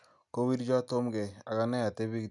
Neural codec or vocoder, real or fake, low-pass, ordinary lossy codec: none; real; 10.8 kHz; none